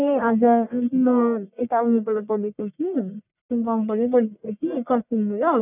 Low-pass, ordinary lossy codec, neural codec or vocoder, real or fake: 3.6 kHz; none; codec, 44.1 kHz, 1.7 kbps, Pupu-Codec; fake